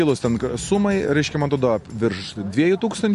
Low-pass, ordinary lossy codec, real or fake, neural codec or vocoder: 14.4 kHz; MP3, 48 kbps; real; none